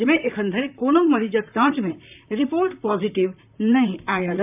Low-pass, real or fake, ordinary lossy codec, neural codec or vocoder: 3.6 kHz; fake; none; vocoder, 44.1 kHz, 128 mel bands, Pupu-Vocoder